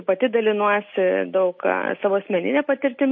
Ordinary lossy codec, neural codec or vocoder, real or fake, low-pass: MP3, 32 kbps; none; real; 7.2 kHz